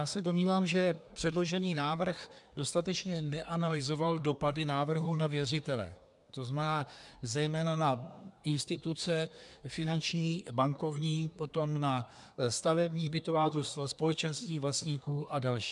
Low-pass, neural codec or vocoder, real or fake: 10.8 kHz; codec, 24 kHz, 1 kbps, SNAC; fake